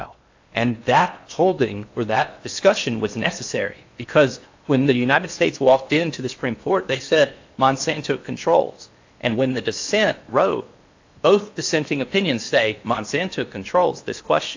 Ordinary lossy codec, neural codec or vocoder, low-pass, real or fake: AAC, 48 kbps; codec, 16 kHz in and 24 kHz out, 0.8 kbps, FocalCodec, streaming, 65536 codes; 7.2 kHz; fake